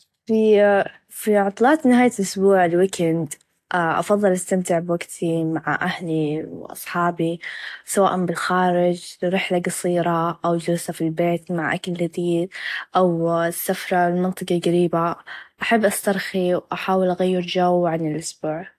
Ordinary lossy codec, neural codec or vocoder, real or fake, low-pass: AAC, 64 kbps; none; real; 14.4 kHz